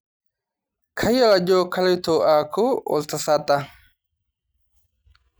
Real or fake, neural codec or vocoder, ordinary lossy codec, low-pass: real; none; none; none